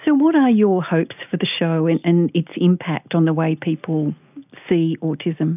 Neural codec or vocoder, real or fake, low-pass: none; real; 3.6 kHz